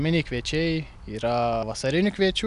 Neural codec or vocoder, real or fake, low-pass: none; real; 10.8 kHz